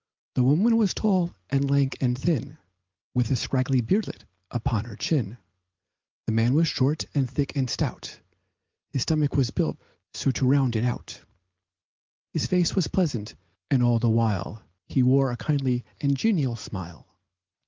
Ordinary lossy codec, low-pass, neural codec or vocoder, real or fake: Opus, 32 kbps; 7.2 kHz; none; real